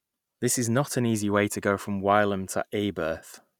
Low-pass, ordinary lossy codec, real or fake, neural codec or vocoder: 19.8 kHz; none; real; none